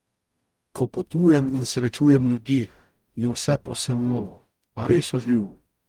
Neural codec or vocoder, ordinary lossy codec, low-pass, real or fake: codec, 44.1 kHz, 0.9 kbps, DAC; Opus, 32 kbps; 19.8 kHz; fake